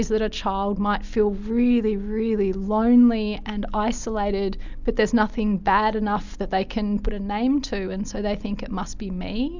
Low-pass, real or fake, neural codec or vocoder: 7.2 kHz; real; none